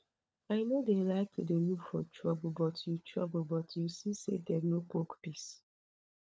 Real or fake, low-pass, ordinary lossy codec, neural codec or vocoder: fake; none; none; codec, 16 kHz, 16 kbps, FunCodec, trained on LibriTTS, 50 frames a second